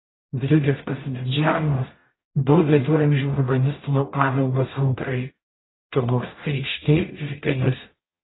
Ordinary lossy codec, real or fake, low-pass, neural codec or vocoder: AAC, 16 kbps; fake; 7.2 kHz; codec, 44.1 kHz, 0.9 kbps, DAC